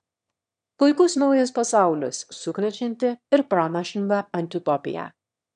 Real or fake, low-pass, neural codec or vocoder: fake; 9.9 kHz; autoencoder, 22.05 kHz, a latent of 192 numbers a frame, VITS, trained on one speaker